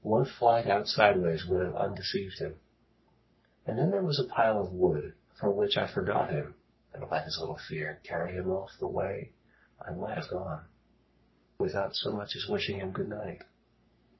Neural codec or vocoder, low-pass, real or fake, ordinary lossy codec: codec, 44.1 kHz, 3.4 kbps, Pupu-Codec; 7.2 kHz; fake; MP3, 24 kbps